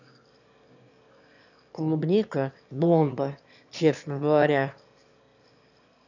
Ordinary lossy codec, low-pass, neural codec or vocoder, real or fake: none; 7.2 kHz; autoencoder, 22.05 kHz, a latent of 192 numbers a frame, VITS, trained on one speaker; fake